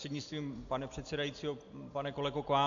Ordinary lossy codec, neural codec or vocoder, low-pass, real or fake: AAC, 64 kbps; none; 7.2 kHz; real